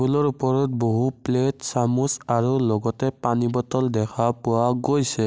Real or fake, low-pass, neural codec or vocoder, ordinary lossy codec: real; none; none; none